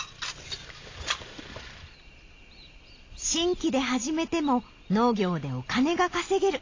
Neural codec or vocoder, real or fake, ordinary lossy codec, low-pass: none; real; AAC, 32 kbps; 7.2 kHz